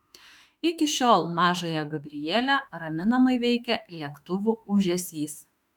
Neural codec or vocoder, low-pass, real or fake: autoencoder, 48 kHz, 32 numbers a frame, DAC-VAE, trained on Japanese speech; 19.8 kHz; fake